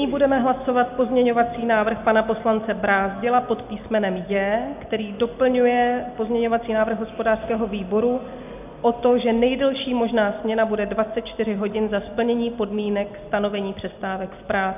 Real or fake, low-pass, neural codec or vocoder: real; 3.6 kHz; none